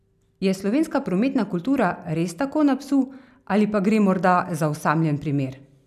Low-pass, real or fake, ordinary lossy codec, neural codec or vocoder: 14.4 kHz; real; none; none